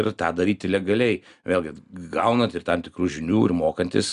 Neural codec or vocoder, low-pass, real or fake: none; 10.8 kHz; real